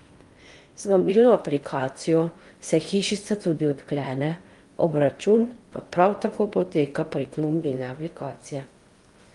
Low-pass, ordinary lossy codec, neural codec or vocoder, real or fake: 10.8 kHz; Opus, 32 kbps; codec, 16 kHz in and 24 kHz out, 0.8 kbps, FocalCodec, streaming, 65536 codes; fake